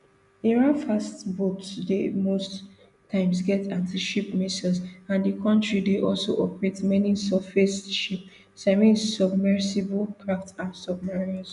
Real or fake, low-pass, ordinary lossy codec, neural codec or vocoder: real; 10.8 kHz; none; none